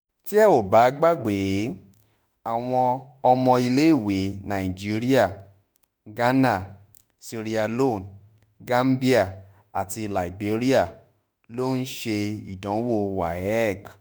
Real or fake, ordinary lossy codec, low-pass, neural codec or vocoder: fake; none; none; autoencoder, 48 kHz, 32 numbers a frame, DAC-VAE, trained on Japanese speech